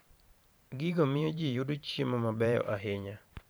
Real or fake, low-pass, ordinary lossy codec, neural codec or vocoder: fake; none; none; vocoder, 44.1 kHz, 128 mel bands every 512 samples, BigVGAN v2